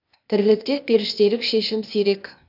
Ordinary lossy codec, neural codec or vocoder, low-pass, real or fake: none; codec, 16 kHz, 0.8 kbps, ZipCodec; 5.4 kHz; fake